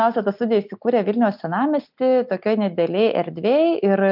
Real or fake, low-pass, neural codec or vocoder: real; 5.4 kHz; none